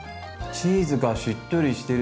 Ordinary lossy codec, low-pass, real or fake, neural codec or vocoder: none; none; real; none